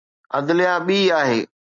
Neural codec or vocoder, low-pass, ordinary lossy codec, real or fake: none; 7.2 kHz; MP3, 96 kbps; real